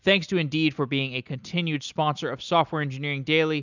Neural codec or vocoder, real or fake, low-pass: none; real; 7.2 kHz